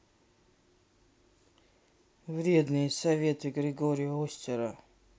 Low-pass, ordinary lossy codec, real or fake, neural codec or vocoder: none; none; real; none